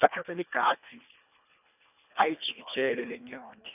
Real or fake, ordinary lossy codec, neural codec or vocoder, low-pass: fake; none; codec, 24 kHz, 1.5 kbps, HILCodec; 3.6 kHz